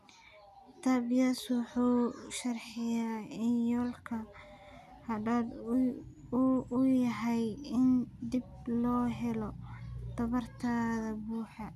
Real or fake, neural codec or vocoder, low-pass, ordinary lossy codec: real; none; 14.4 kHz; none